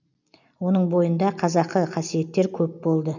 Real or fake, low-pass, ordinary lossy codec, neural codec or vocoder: real; 7.2 kHz; none; none